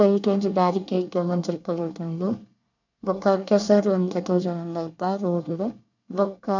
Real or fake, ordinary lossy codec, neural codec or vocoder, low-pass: fake; none; codec, 24 kHz, 1 kbps, SNAC; 7.2 kHz